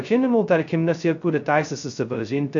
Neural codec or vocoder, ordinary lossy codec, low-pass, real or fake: codec, 16 kHz, 0.2 kbps, FocalCodec; MP3, 64 kbps; 7.2 kHz; fake